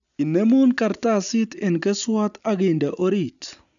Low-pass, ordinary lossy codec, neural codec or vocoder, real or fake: 7.2 kHz; none; none; real